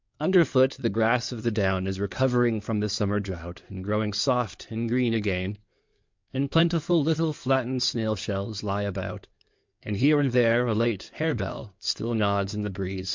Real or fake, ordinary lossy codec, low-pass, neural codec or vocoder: fake; MP3, 64 kbps; 7.2 kHz; codec, 16 kHz in and 24 kHz out, 2.2 kbps, FireRedTTS-2 codec